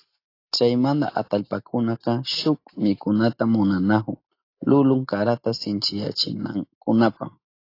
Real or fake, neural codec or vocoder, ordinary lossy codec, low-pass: real; none; AAC, 32 kbps; 5.4 kHz